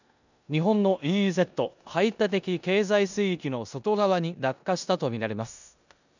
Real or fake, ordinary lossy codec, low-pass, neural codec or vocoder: fake; none; 7.2 kHz; codec, 16 kHz in and 24 kHz out, 0.9 kbps, LongCat-Audio-Codec, four codebook decoder